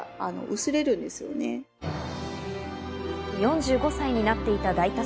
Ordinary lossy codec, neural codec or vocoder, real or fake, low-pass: none; none; real; none